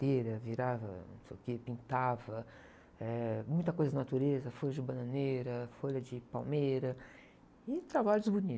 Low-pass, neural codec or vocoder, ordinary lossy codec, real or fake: none; none; none; real